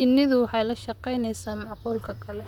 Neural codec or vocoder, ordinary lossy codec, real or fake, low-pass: vocoder, 44.1 kHz, 128 mel bands, Pupu-Vocoder; none; fake; 19.8 kHz